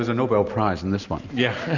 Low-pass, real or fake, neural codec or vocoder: 7.2 kHz; real; none